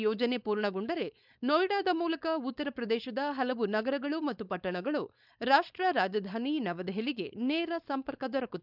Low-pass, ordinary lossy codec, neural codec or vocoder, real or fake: 5.4 kHz; none; codec, 16 kHz, 4.8 kbps, FACodec; fake